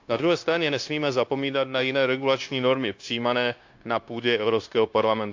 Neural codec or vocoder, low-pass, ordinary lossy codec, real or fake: codec, 16 kHz, 0.9 kbps, LongCat-Audio-Codec; 7.2 kHz; AAC, 48 kbps; fake